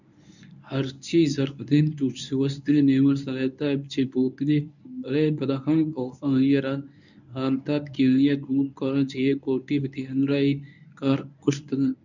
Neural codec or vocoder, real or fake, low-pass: codec, 24 kHz, 0.9 kbps, WavTokenizer, medium speech release version 1; fake; 7.2 kHz